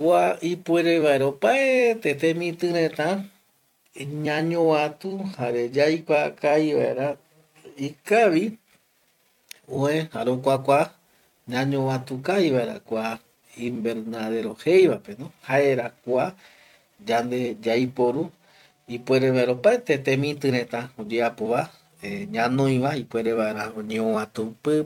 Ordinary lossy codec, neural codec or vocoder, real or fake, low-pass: none; vocoder, 44.1 kHz, 128 mel bands every 256 samples, BigVGAN v2; fake; 19.8 kHz